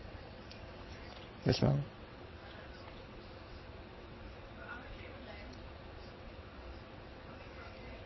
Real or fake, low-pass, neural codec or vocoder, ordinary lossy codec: fake; 7.2 kHz; vocoder, 22.05 kHz, 80 mel bands, WaveNeXt; MP3, 24 kbps